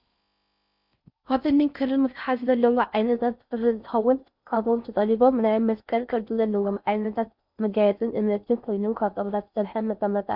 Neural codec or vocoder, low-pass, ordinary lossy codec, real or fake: codec, 16 kHz in and 24 kHz out, 0.6 kbps, FocalCodec, streaming, 4096 codes; 5.4 kHz; Opus, 64 kbps; fake